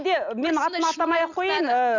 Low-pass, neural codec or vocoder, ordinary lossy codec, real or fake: 7.2 kHz; none; none; real